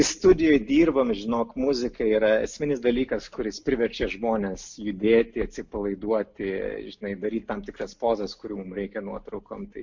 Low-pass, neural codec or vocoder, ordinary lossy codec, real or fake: 7.2 kHz; none; MP3, 48 kbps; real